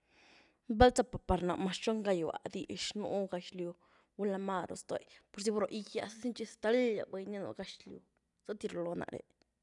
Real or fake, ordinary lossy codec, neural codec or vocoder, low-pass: real; none; none; 10.8 kHz